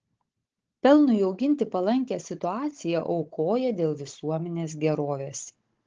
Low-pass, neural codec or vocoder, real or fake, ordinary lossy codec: 7.2 kHz; codec, 16 kHz, 16 kbps, FunCodec, trained on Chinese and English, 50 frames a second; fake; Opus, 16 kbps